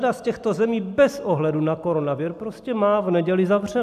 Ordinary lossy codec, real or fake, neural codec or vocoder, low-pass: AAC, 96 kbps; real; none; 14.4 kHz